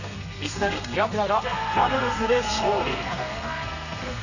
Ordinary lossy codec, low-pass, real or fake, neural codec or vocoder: none; 7.2 kHz; fake; codec, 32 kHz, 1.9 kbps, SNAC